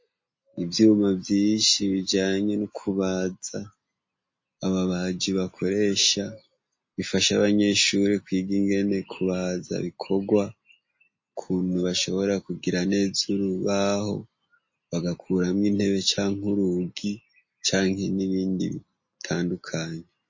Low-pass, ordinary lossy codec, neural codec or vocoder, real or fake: 7.2 kHz; MP3, 32 kbps; none; real